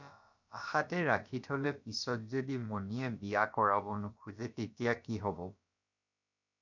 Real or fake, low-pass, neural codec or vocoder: fake; 7.2 kHz; codec, 16 kHz, about 1 kbps, DyCAST, with the encoder's durations